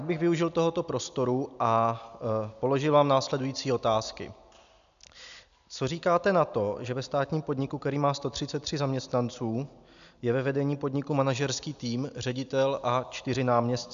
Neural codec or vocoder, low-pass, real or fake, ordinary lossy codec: none; 7.2 kHz; real; AAC, 96 kbps